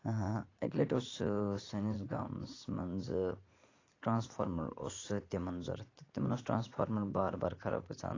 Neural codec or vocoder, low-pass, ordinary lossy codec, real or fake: vocoder, 44.1 kHz, 80 mel bands, Vocos; 7.2 kHz; AAC, 32 kbps; fake